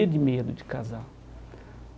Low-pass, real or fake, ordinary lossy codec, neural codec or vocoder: none; real; none; none